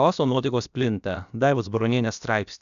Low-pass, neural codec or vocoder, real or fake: 7.2 kHz; codec, 16 kHz, about 1 kbps, DyCAST, with the encoder's durations; fake